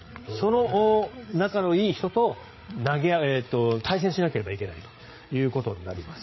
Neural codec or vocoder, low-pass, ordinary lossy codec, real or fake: codec, 16 kHz, 8 kbps, FreqCodec, larger model; 7.2 kHz; MP3, 24 kbps; fake